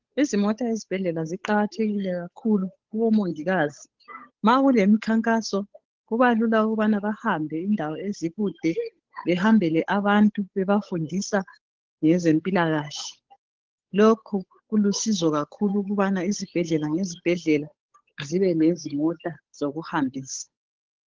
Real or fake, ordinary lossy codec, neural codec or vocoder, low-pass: fake; Opus, 16 kbps; codec, 16 kHz, 8 kbps, FunCodec, trained on Chinese and English, 25 frames a second; 7.2 kHz